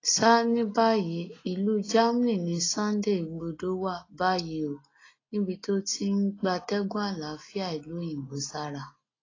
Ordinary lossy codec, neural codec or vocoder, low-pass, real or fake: AAC, 32 kbps; none; 7.2 kHz; real